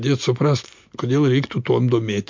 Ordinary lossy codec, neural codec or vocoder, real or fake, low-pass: MP3, 48 kbps; none; real; 7.2 kHz